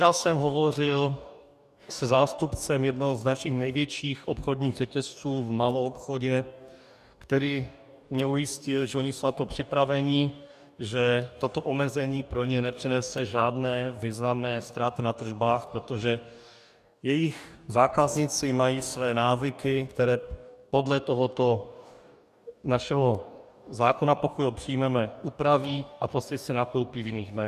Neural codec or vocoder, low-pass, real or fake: codec, 44.1 kHz, 2.6 kbps, DAC; 14.4 kHz; fake